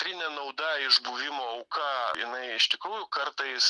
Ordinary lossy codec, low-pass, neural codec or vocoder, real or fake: Opus, 64 kbps; 10.8 kHz; none; real